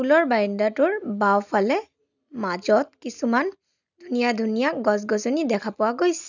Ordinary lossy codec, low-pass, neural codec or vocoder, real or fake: none; 7.2 kHz; none; real